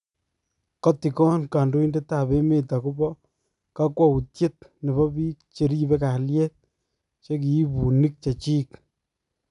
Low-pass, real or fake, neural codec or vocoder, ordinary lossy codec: 10.8 kHz; real; none; none